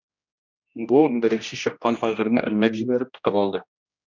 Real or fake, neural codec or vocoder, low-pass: fake; codec, 16 kHz, 1 kbps, X-Codec, HuBERT features, trained on general audio; 7.2 kHz